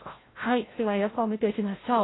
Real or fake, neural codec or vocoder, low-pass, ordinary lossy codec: fake; codec, 16 kHz, 0.5 kbps, FreqCodec, larger model; 7.2 kHz; AAC, 16 kbps